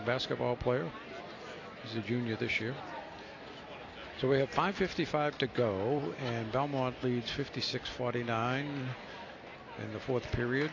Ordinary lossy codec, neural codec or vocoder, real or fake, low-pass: AAC, 32 kbps; none; real; 7.2 kHz